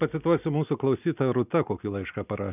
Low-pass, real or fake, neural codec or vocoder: 3.6 kHz; real; none